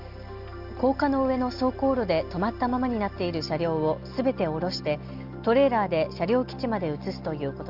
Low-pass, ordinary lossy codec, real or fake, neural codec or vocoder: 5.4 kHz; Opus, 32 kbps; real; none